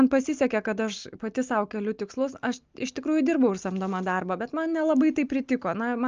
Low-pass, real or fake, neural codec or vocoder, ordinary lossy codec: 7.2 kHz; real; none; Opus, 24 kbps